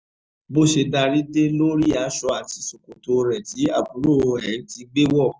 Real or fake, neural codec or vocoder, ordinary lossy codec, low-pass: real; none; none; none